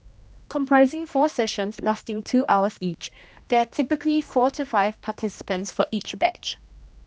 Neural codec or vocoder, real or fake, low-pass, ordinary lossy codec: codec, 16 kHz, 1 kbps, X-Codec, HuBERT features, trained on general audio; fake; none; none